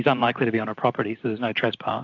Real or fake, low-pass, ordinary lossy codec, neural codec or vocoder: fake; 7.2 kHz; AAC, 48 kbps; vocoder, 44.1 kHz, 128 mel bands every 512 samples, BigVGAN v2